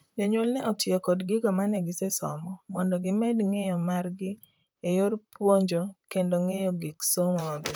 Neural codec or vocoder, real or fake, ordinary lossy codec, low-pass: vocoder, 44.1 kHz, 128 mel bands, Pupu-Vocoder; fake; none; none